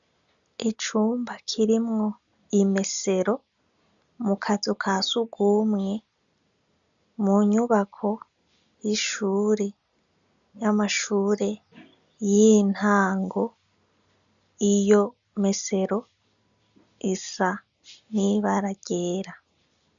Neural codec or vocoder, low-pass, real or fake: none; 7.2 kHz; real